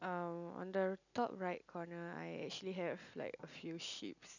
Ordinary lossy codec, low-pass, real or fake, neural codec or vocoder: none; 7.2 kHz; real; none